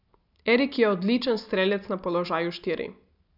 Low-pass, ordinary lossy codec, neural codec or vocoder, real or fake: 5.4 kHz; none; none; real